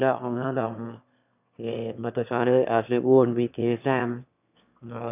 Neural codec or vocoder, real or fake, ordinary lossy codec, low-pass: autoencoder, 22.05 kHz, a latent of 192 numbers a frame, VITS, trained on one speaker; fake; none; 3.6 kHz